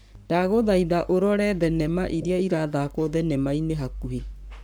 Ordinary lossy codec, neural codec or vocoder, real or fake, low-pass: none; codec, 44.1 kHz, 7.8 kbps, Pupu-Codec; fake; none